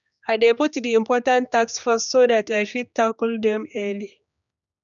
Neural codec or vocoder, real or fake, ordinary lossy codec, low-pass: codec, 16 kHz, 2 kbps, X-Codec, HuBERT features, trained on general audio; fake; none; 7.2 kHz